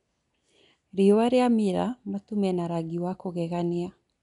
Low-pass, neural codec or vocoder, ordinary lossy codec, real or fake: 10.8 kHz; none; none; real